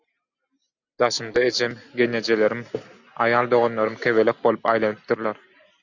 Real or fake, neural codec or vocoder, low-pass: real; none; 7.2 kHz